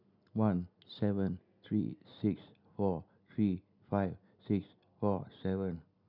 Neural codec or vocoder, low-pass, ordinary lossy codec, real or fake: none; 5.4 kHz; none; real